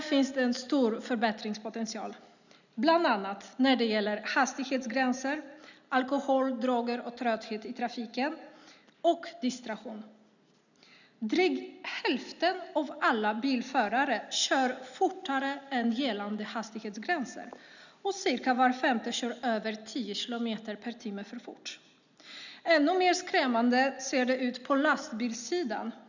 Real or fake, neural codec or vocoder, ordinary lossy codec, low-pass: real; none; none; 7.2 kHz